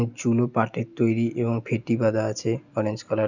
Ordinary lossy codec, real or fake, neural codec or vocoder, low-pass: none; real; none; 7.2 kHz